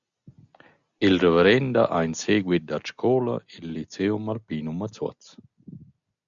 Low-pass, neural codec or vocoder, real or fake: 7.2 kHz; none; real